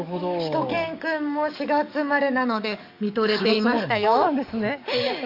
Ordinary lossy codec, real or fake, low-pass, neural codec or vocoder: AAC, 48 kbps; fake; 5.4 kHz; codec, 44.1 kHz, 7.8 kbps, DAC